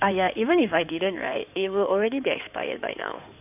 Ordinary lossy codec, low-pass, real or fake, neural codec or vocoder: none; 3.6 kHz; fake; codec, 16 kHz in and 24 kHz out, 2.2 kbps, FireRedTTS-2 codec